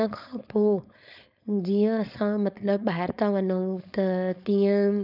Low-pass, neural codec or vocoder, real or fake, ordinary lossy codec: 5.4 kHz; codec, 16 kHz, 4.8 kbps, FACodec; fake; none